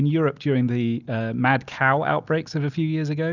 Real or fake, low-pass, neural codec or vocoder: real; 7.2 kHz; none